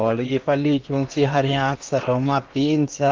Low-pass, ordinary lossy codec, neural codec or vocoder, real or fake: 7.2 kHz; Opus, 32 kbps; codec, 16 kHz in and 24 kHz out, 0.8 kbps, FocalCodec, streaming, 65536 codes; fake